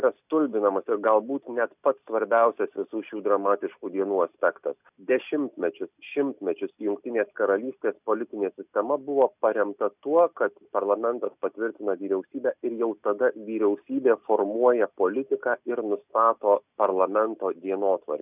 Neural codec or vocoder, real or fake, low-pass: none; real; 3.6 kHz